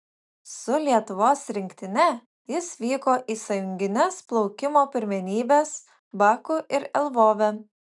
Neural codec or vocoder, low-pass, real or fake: none; 10.8 kHz; real